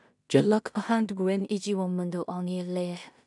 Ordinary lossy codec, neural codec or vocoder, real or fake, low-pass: none; codec, 16 kHz in and 24 kHz out, 0.4 kbps, LongCat-Audio-Codec, two codebook decoder; fake; 10.8 kHz